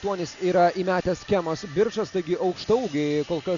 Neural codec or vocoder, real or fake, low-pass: none; real; 7.2 kHz